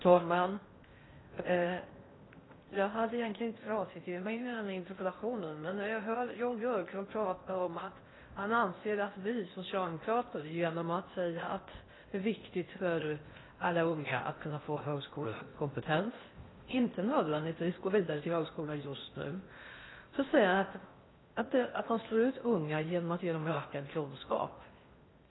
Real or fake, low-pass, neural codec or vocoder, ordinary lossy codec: fake; 7.2 kHz; codec, 16 kHz in and 24 kHz out, 0.6 kbps, FocalCodec, streaming, 4096 codes; AAC, 16 kbps